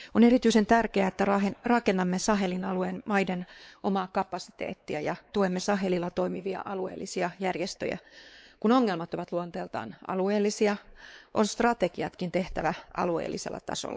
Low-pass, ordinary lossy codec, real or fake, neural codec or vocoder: none; none; fake; codec, 16 kHz, 4 kbps, X-Codec, WavLM features, trained on Multilingual LibriSpeech